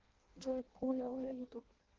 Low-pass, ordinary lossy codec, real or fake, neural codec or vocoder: 7.2 kHz; Opus, 16 kbps; fake; codec, 16 kHz in and 24 kHz out, 0.6 kbps, FireRedTTS-2 codec